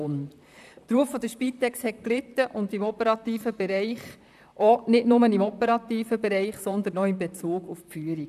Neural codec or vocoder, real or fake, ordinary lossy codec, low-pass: vocoder, 44.1 kHz, 128 mel bands, Pupu-Vocoder; fake; none; 14.4 kHz